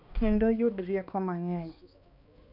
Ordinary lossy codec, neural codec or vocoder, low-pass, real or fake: none; codec, 16 kHz, 2 kbps, X-Codec, HuBERT features, trained on balanced general audio; 5.4 kHz; fake